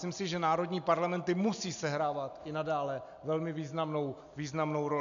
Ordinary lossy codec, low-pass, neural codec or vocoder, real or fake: MP3, 96 kbps; 7.2 kHz; none; real